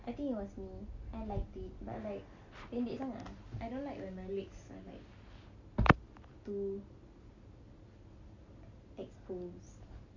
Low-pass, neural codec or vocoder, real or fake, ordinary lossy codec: 7.2 kHz; none; real; MP3, 48 kbps